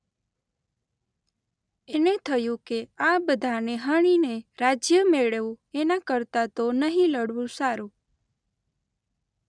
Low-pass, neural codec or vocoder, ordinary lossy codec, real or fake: 9.9 kHz; none; none; real